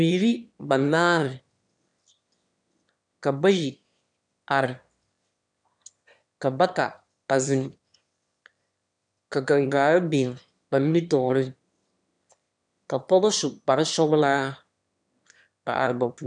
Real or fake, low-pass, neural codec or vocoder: fake; 9.9 kHz; autoencoder, 22.05 kHz, a latent of 192 numbers a frame, VITS, trained on one speaker